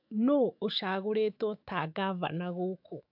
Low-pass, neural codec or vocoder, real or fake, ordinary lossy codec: 5.4 kHz; autoencoder, 48 kHz, 128 numbers a frame, DAC-VAE, trained on Japanese speech; fake; AAC, 48 kbps